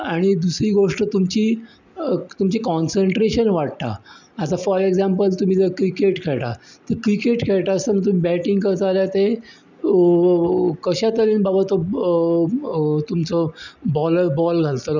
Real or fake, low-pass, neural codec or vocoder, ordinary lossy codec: real; 7.2 kHz; none; none